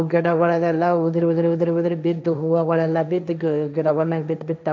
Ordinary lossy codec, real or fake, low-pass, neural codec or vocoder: none; fake; none; codec, 16 kHz, 1.1 kbps, Voila-Tokenizer